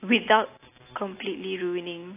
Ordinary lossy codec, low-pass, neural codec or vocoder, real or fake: AAC, 24 kbps; 3.6 kHz; none; real